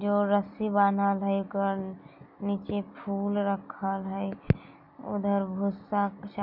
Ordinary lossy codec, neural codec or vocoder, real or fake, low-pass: none; none; real; 5.4 kHz